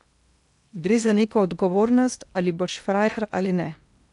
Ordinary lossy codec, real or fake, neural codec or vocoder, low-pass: none; fake; codec, 16 kHz in and 24 kHz out, 0.8 kbps, FocalCodec, streaming, 65536 codes; 10.8 kHz